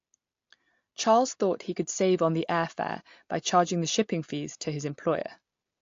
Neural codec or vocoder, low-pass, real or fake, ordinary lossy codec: none; 7.2 kHz; real; AAC, 48 kbps